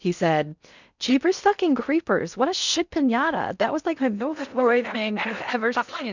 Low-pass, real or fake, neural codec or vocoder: 7.2 kHz; fake; codec, 16 kHz in and 24 kHz out, 0.6 kbps, FocalCodec, streaming, 2048 codes